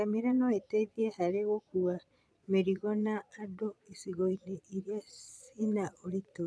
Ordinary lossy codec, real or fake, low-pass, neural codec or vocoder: none; fake; none; vocoder, 22.05 kHz, 80 mel bands, Vocos